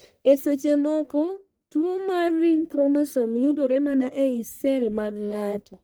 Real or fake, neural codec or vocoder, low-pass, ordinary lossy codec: fake; codec, 44.1 kHz, 1.7 kbps, Pupu-Codec; none; none